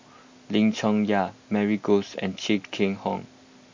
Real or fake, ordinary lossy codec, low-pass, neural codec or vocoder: real; MP3, 48 kbps; 7.2 kHz; none